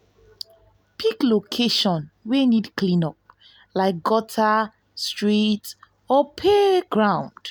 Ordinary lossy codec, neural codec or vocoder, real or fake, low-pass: none; none; real; none